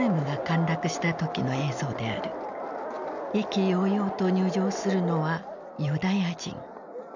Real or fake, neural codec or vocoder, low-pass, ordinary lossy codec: real; none; 7.2 kHz; none